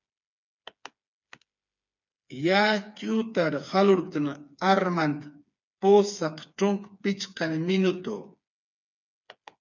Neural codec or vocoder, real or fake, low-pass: codec, 16 kHz, 4 kbps, FreqCodec, smaller model; fake; 7.2 kHz